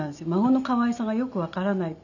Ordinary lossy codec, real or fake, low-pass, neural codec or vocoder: none; real; 7.2 kHz; none